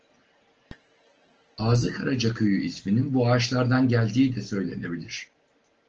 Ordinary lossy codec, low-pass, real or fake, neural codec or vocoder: Opus, 16 kbps; 7.2 kHz; real; none